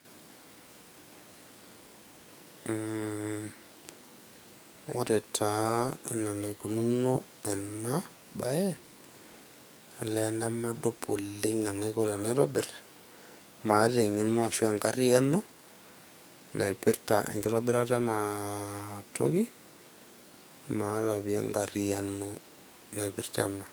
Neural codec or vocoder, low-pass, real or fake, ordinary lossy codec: codec, 44.1 kHz, 2.6 kbps, SNAC; none; fake; none